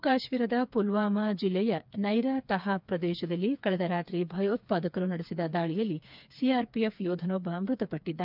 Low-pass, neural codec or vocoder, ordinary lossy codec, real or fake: 5.4 kHz; codec, 16 kHz, 4 kbps, FreqCodec, smaller model; none; fake